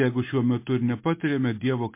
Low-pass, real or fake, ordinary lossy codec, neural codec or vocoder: 3.6 kHz; real; MP3, 24 kbps; none